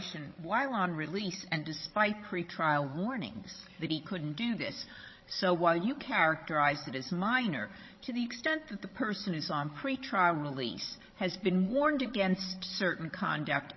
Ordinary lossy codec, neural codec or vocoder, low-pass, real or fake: MP3, 24 kbps; codec, 16 kHz, 16 kbps, FreqCodec, larger model; 7.2 kHz; fake